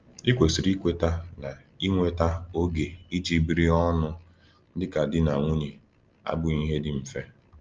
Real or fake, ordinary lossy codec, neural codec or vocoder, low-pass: real; Opus, 24 kbps; none; 7.2 kHz